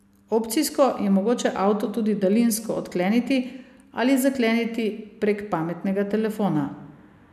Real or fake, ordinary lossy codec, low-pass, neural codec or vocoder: real; none; 14.4 kHz; none